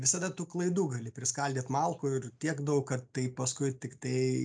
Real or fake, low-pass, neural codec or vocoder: real; 9.9 kHz; none